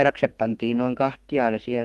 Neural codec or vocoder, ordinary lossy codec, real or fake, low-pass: codec, 32 kHz, 1.9 kbps, SNAC; Opus, 24 kbps; fake; 14.4 kHz